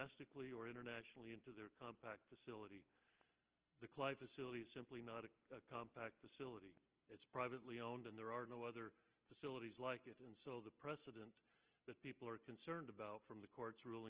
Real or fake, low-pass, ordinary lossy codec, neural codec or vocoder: real; 3.6 kHz; Opus, 16 kbps; none